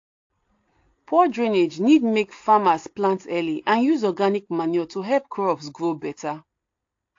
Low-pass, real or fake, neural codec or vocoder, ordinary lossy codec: 7.2 kHz; real; none; AAC, 48 kbps